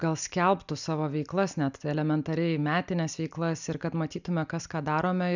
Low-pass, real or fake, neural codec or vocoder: 7.2 kHz; real; none